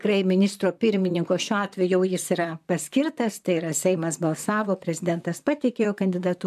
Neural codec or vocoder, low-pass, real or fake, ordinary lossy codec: vocoder, 44.1 kHz, 128 mel bands, Pupu-Vocoder; 14.4 kHz; fake; MP3, 96 kbps